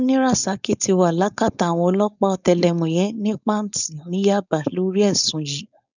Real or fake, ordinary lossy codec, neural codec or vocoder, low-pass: fake; none; codec, 16 kHz, 4.8 kbps, FACodec; 7.2 kHz